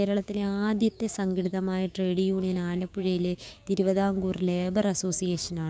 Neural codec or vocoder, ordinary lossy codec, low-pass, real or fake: codec, 16 kHz, 6 kbps, DAC; none; none; fake